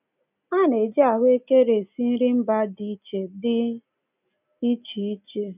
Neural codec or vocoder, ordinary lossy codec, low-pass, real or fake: none; none; 3.6 kHz; real